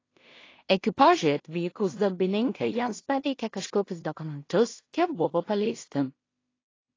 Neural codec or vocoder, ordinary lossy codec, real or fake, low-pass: codec, 16 kHz in and 24 kHz out, 0.4 kbps, LongCat-Audio-Codec, two codebook decoder; AAC, 32 kbps; fake; 7.2 kHz